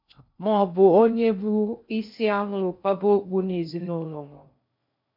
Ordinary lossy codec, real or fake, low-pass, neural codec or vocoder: MP3, 48 kbps; fake; 5.4 kHz; codec, 16 kHz in and 24 kHz out, 0.6 kbps, FocalCodec, streaming, 2048 codes